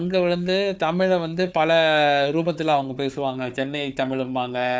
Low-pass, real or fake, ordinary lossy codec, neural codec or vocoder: none; fake; none; codec, 16 kHz, 4 kbps, FunCodec, trained on Chinese and English, 50 frames a second